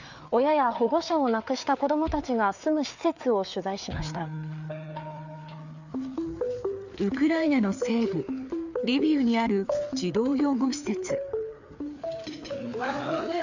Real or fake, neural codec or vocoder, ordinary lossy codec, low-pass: fake; codec, 16 kHz, 4 kbps, FreqCodec, larger model; none; 7.2 kHz